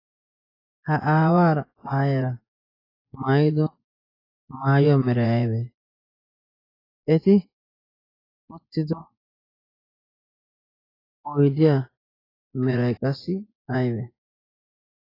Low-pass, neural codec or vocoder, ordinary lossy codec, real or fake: 5.4 kHz; vocoder, 24 kHz, 100 mel bands, Vocos; AAC, 24 kbps; fake